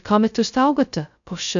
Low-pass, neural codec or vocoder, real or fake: 7.2 kHz; codec, 16 kHz, 0.2 kbps, FocalCodec; fake